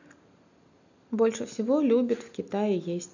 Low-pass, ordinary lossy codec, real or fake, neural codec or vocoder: 7.2 kHz; none; real; none